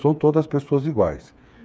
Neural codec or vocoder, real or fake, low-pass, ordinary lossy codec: codec, 16 kHz, 8 kbps, FreqCodec, smaller model; fake; none; none